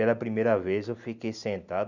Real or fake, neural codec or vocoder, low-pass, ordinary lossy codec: real; none; 7.2 kHz; none